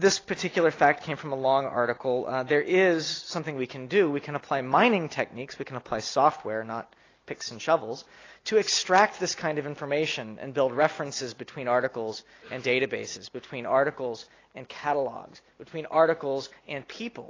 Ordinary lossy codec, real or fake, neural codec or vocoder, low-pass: AAC, 32 kbps; real; none; 7.2 kHz